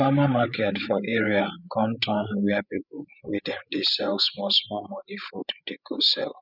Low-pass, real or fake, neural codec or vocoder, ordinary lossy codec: 5.4 kHz; fake; codec, 16 kHz, 8 kbps, FreqCodec, larger model; none